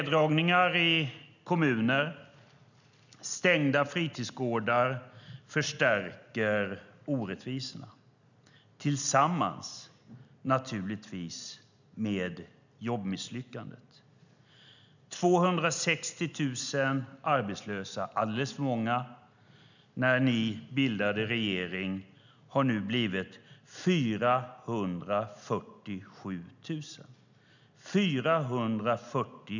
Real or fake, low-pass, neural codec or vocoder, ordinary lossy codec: real; 7.2 kHz; none; none